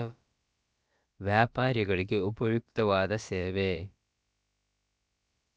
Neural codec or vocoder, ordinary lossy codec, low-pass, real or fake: codec, 16 kHz, about 1 kbps, DyCAST, with the encoder's durations; none; none; fake